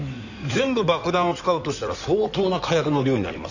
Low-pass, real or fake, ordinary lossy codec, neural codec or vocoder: 7.2 kHz; fake; none; codec, 16 kHz in and 24 kHz out, 2.2 kbps, FireRedTTS-2 codec